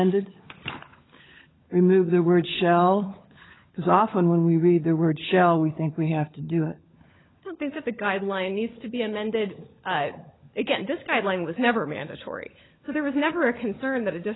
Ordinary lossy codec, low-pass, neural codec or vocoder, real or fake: AAC, 16 kbps; 7.2 kHz; codec, 16 kHz, 16 kbps, FunCodec, trained on LibriTTS, 50 frames a second; fake